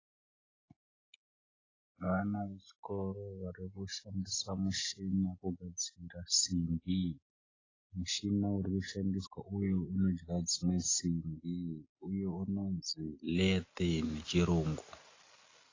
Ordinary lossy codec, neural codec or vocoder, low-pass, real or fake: AAC, 32 kbps; none; 7.2 kHz; real